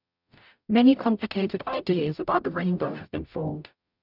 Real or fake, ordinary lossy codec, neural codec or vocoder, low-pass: fake; none; codec, 44.1 kHz, 0.9 kbps, DAC; 5.4 kHz